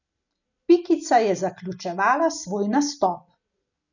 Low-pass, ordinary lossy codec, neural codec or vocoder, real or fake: 7.2 kHz; none; none; real